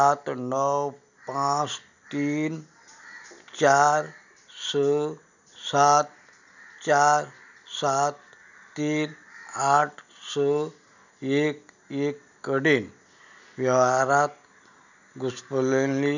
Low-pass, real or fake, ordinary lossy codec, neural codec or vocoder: 7.2 kHz; real; none; none